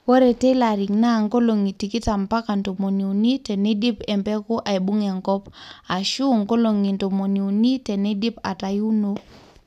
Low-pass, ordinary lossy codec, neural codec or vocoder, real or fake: 14.4 kHz; none; none; real